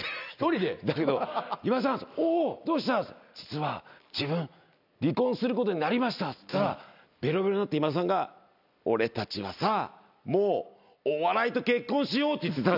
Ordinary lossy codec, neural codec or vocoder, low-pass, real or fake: none; none; 5.4 kHz; real